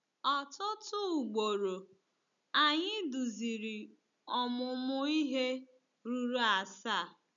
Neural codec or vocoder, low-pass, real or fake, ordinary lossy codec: none; 7.2 kHz; real; none